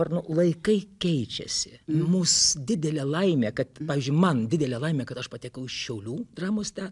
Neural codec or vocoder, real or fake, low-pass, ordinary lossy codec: none; real; 10.8 kHz; MP3, 96 kbps